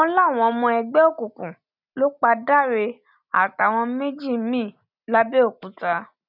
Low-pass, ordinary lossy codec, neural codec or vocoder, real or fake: 5.4 kHz; none; none; real